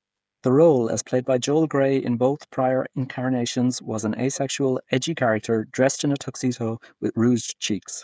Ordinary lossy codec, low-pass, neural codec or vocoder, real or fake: none; none; codec, 16 kHz, 8 kbps, FreqCodec, smaller model; fake